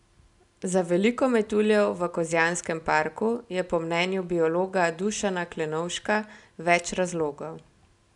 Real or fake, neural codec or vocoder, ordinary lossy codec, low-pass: real; none; none; 10.8 kHz